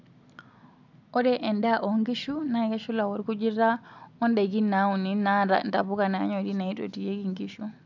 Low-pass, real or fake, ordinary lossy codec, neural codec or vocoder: 7.2 kHz; real; none; none